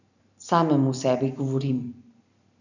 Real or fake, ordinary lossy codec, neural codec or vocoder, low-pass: fake; none; vocoder, 44.1 kHz, 128 mel bands every 512 samples, BigVGAN v2; 7.2 kHz